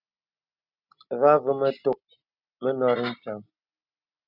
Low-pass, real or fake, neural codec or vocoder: 5.4 kHz; real; none